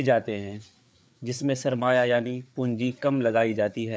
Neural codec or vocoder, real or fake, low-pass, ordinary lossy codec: codec, 16 kHz, 4 kbps, FreqCodec, larger model; fake; none; none